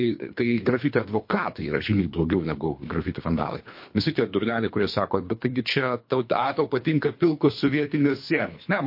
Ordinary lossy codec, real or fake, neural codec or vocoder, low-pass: MP3, 32 kbps; fake; codec, 24 kHz, 3 kbps, HILCodec; 5.4 kHz